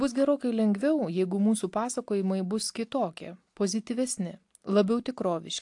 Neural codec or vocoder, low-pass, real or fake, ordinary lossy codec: none; 10.8 kHz; real; AAC, 64 kbps